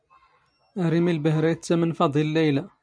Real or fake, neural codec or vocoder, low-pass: real; none; 9.9 kHz